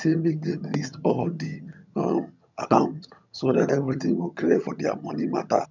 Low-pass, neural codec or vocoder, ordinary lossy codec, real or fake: 7.2 kHz; vocoder, 22.05 kHz, 80 mel bands, HiFi-GAN; none; fake